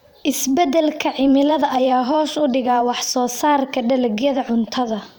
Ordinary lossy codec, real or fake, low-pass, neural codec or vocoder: none; fake; none; vocoder, 44.1 kHz, 128 mel bands every 512 samples, BigVGAN v2